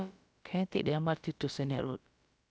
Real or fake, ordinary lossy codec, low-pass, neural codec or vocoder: fake; none; none; codec, 16 kHz, about 1 kbps, DyCAST, with the encoder's durations